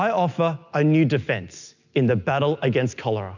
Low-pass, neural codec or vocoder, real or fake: 7.2 kHz; none; real